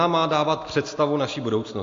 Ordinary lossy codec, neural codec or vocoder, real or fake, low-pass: AAC, 48 kbps; none; real; 7.2 kHz